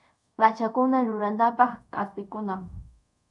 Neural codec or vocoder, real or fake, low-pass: codec, 24 kHz, 0.5 kbps, DualCodec; fake; 10.8 kHz